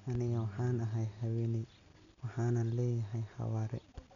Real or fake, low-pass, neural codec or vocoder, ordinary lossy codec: real; 7.2 kHz; none; none